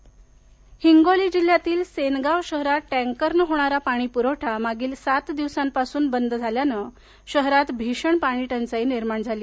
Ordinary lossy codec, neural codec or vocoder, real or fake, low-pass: none; none; real; none